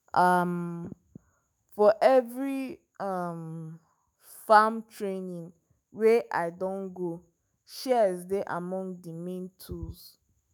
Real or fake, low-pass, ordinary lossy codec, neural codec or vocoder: fake; none; none; autoencoder, 48 kHz, 128 numbers a frame, DAC-VAE, trained on Japanese speech